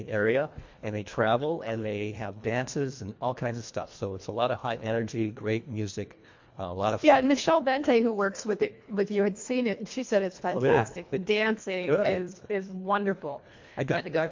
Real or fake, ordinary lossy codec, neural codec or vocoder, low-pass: fake; MP3, 48 kbps; codec, 24 kHz, 1.5 kbps, HILCodec; 7.2 kHz